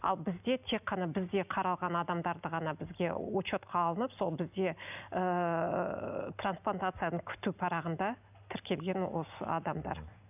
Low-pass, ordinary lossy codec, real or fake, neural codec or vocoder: 3.6 kHz; none; real; none